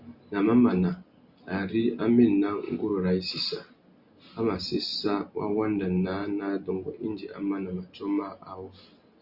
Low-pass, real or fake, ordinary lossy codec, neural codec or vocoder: 5.4 kHz; real; Opus, 64 kbps; none